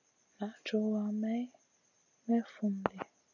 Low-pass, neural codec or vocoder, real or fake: 7.2 kHz; none; real